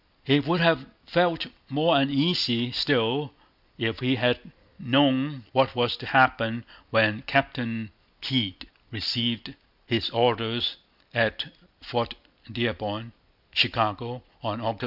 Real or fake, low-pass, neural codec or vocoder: real; 5.4 kHz; none